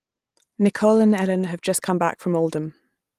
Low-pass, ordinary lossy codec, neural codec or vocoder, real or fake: 14.4 kHz; Opus, 24 kbps; none; real